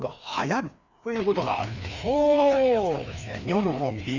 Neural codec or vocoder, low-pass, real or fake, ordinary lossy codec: codec, 16 kHz, 2 kbps, FreqCodec, larger model; 7.2 kHz; fake; none